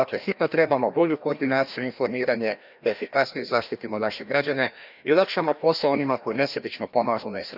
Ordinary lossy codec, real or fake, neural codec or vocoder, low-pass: none; fake; codec, 16 kHz, 1 kbps, FreqCodec, larger model; 5.4 kHz